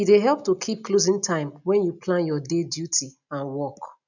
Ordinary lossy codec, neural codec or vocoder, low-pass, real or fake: none; none; 7.2 kHz; real